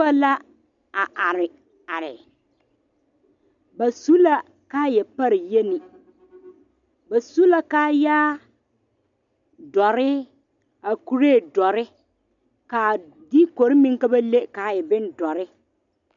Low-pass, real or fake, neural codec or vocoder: 7.2 kHz; real; none